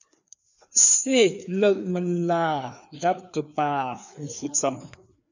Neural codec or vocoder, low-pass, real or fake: codec, 16 kHz, 2 kbps, FreqCodec, larger model; 7.2 kHz; fake